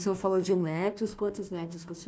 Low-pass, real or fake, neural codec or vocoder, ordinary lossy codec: none; fake; codec, 16 kHz, 1 kbps, FunCodec, trained on Chinese and English, 50 frames a second; none